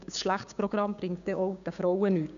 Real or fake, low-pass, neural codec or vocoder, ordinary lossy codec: fake; 7.2 kHz; codec, 16 kHz, 6 kbps, DAC; none